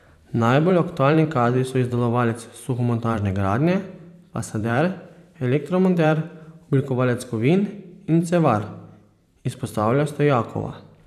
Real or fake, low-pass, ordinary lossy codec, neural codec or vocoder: fake; 14.4 kHz; none; vocoder, 44.1 kHz, 128 mel bands every 512 samples, BigVGAN v2